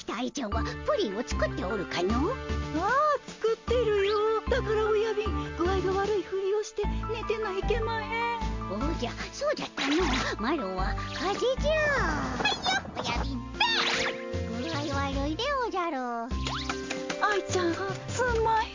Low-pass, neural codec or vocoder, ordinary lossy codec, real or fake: 7.2 kHz; none; MP3, 64 kbps; real